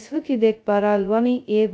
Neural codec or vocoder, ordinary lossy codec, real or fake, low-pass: codec, 16 kHz, 0.2 kbps, FocalCodec; none; fake; none